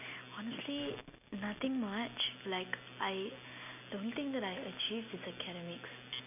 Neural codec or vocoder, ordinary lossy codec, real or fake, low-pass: none; none; real; 3.6 kHz